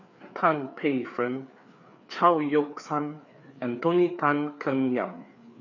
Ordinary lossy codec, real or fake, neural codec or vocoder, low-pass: none; fake; codec, 16 kHz, 4 kbps, FreqCodec, larger model; 7.2 kHz